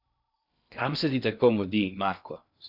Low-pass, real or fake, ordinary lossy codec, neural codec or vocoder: 5.4 kHz; fake; AAC, 48 kbps; codec, 16 kHz in and 24 kHz out, 0.6 kbps, FocalCodec, streaming, 2048 codes